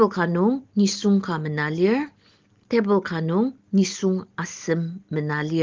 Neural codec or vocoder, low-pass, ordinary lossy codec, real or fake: none; 7.2 kHz; Opus, 16 kbps; real